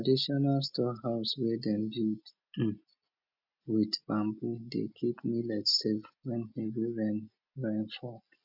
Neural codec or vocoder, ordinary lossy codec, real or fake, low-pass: none; none; real; 5.4 kHz